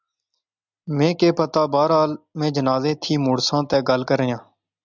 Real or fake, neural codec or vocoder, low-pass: real; none; 7.2 kHz